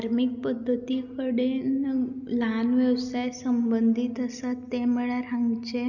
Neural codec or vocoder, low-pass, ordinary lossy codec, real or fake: none; 7.2 kHz; none; real